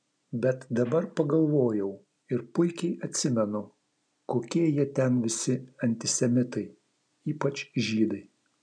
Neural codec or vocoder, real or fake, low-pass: none; real; 9.9 kHz